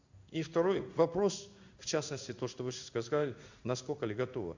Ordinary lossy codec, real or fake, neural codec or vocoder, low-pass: Opus, 64 kbps; fake; codec, 16 kHz in and 24 kHz out, 1 kbps, XY-Tokenizer; 7.2 kHz